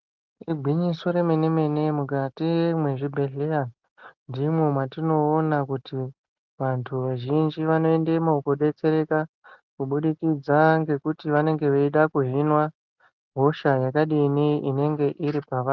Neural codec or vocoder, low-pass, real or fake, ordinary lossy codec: none; 7.2 kHz; real; Opus, 32 kbps